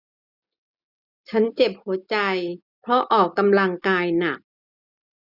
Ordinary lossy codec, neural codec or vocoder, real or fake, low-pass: none; none; real; 5.4 kHz